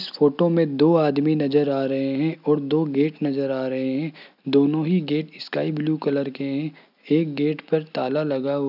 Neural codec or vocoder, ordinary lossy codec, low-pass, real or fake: none; none; 5.4 kHz; real